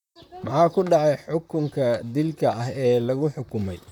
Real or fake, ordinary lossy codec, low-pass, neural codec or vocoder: fake; none; 19.8 kHz; vocoder, 44.1 kHz, 128 mel bands, Pupu-Vocoder